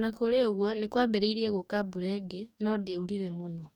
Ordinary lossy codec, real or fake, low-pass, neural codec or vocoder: none; fake; 19.8 kHz; codec, 44.1 kHz, 2.6 kbps, DAC